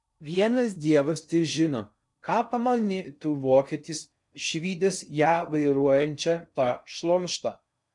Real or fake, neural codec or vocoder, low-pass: fake; codec, 16 kHz in and 24 kHz out, 0.6 kbps, FocalCodec, streaming, 4096 codes; 10.8 kHz